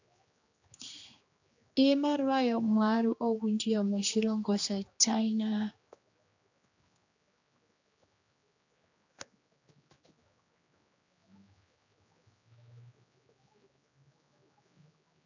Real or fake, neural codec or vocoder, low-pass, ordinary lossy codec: fake; codec, 16 kHz, 2 kbps, X-Codec, HuBERT features, trained on general audio; 7.2 kHz; AAC, 48 kbps